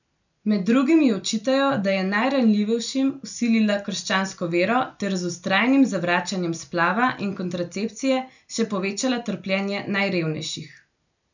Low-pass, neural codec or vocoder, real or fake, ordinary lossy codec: 7.2 kHz; none; real; none